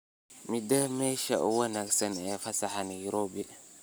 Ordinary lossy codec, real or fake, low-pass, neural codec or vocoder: none; real; none; none